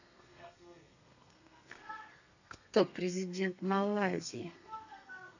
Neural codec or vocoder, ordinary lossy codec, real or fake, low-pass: codec, 32 kHz, 1.9 kbps, SNAC; none; fake; 7.2 kHz